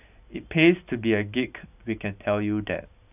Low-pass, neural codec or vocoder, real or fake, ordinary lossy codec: 3.6 kHz; none; real; none